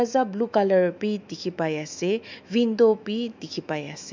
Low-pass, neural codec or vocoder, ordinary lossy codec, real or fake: 7.2 kHz; autoencoder, 48 kHz, 128 numbers a frame, DAC-VAE, trained on Japanese speech; MP3, 64 kbps; fake